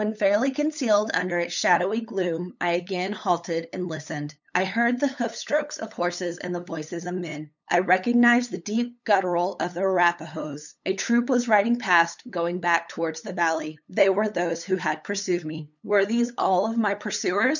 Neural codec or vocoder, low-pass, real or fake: codec, 16 kHz, 8 kbps, FunCodec, trained on LibriTTS, 25 frames a second; 7.2 kHz; fake